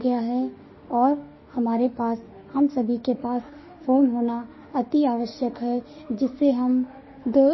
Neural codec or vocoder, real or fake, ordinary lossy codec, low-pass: autoencoder, 48 kHz, 32 numbers a frame, DAC-VAE, trained on Japanese speech; fake; MP3, 24 kbps; 7.2 kHz